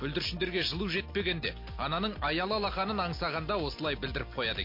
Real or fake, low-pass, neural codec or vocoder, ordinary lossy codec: real; 5.4 kHz; none; MP3, 32 kbps